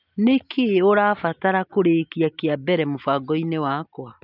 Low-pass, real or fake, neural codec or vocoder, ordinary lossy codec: 5.4 kHz; real; none; none